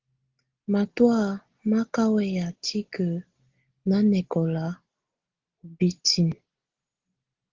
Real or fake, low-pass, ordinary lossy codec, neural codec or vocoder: real; 7.2 kHz; Opus, 16 kbps; none